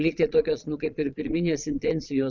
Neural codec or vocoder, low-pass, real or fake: vocoder, 22.05 kHz, 80 mel bands, Vocos; 7.2 kHz; fake